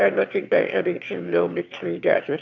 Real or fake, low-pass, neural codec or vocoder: fake; 7.2 kHz; autoencoder, 22.05 kHz, a latent of 192 numbers a frame, VITS, trained on one speaker